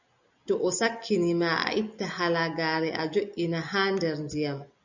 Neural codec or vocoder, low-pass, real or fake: none; 7.2 kHz; real